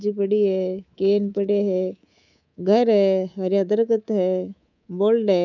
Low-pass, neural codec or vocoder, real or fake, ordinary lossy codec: 7.2 kHz; codec, 24 kHz, 3.1 kbps, DualCodec; fake; none